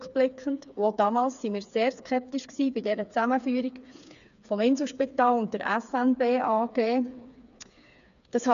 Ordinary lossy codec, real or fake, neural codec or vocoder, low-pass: none; fake; codec, 16 kHz, 4 kbps, FreqCodec, smaller model; 7.2 kHz